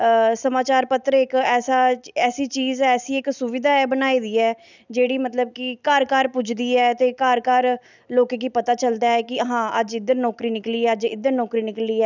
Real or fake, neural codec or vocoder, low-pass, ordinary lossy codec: real; none; 7.2 kHz; none